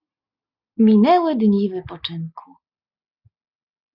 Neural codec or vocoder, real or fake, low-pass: none; real; 5.4 kHz